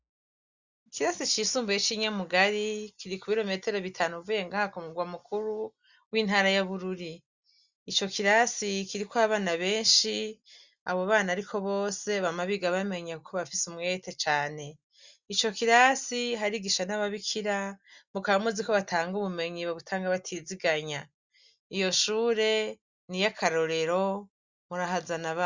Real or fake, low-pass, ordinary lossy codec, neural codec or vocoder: real; 7.2 kHz; Opus, 64 kbps; none